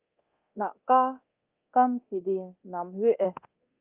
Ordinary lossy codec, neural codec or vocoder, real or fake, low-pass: Opus, 24 kbps; codec, 24 kHz, 0.9 kbps, DualCodec; fake; 3.6 kHz